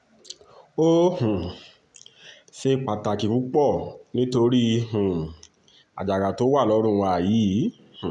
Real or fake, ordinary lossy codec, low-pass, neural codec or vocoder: real; none; 10.8 kHz; none